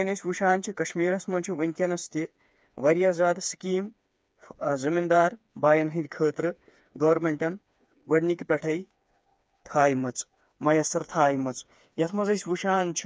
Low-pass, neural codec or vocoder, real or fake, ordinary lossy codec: none; codec, 16 kHz, 4 kbps, FreqCodec, smaller model; fake; none